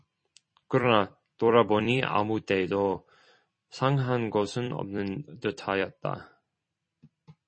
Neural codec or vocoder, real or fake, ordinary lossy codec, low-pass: vocoder, 44.1 kHz, 128 mel bands every 256 samples, BigVGAN v2; fake; MP3, 32 kbps; 9.9 kHz